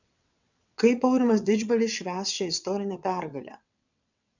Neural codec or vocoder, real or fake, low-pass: vocoder, 22.05 kHz, 80 mel bands, WaveNeXt; fake; 7.2 kHz